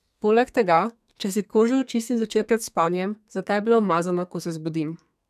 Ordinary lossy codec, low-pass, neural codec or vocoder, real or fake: none; 14.4 kHz; codec, 32 kHz, 1.9 kbps, SNAC; fake